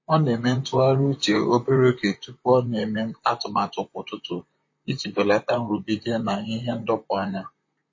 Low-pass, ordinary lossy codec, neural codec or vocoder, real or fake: 7.2 kHz; MP3, 32 kbps; vocoder, 44.1 kHz, 128 mel bands, Pupu-Vocoder; fake